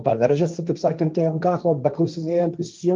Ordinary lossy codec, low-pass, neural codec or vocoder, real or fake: Opus, 24 kbps; 7.2 kHz; codec, 16 kHz, 1.1 kbps, Voila-Tokenizer; fake